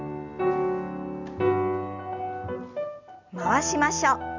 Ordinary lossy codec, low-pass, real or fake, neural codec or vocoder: Opus, 64 kbps; 7.2 kHz; real; none